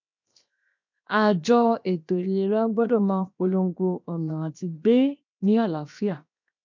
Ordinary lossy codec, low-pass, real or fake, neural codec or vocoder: MP3, 64 kbps; 7.2 kHz; fake; codec, 16 kHz, 0.7 kbps, FocalCodec